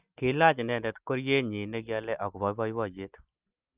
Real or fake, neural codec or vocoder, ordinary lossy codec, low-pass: real; none; Opus, 24 kbps; 3.6 kHz